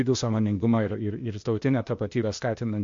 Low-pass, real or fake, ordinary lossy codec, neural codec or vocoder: 7.2 kHz; fake; MP3, 48 kbps; codec, 16 kHz, 0.8 kbps, ZipCodec